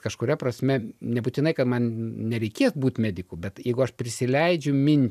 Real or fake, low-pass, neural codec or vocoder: real; 14.4 kHz; none